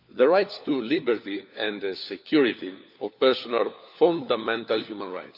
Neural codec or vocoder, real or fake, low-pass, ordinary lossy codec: codec, 16 kHz, 4 kbps, FunCodec, trained on LibriTTS, 50 frames a second; fake; 5.4 kHz; none